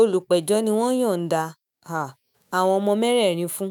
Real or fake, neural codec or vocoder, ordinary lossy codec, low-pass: fake; autoencoder, 48 kHz, 128 numbers a frame, DAC-VAE, trained on Japanese speech; none; none